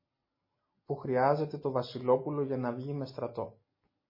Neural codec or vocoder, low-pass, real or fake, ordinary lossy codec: none; 5.4 kHz; real; MP3, 24 kbps